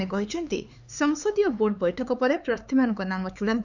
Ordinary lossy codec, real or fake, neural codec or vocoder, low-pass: none; fake; codec, 16 kHz, 4 kbps, X-Codec, HuBERT features, trained on LibriSpeech; 7.2 kHz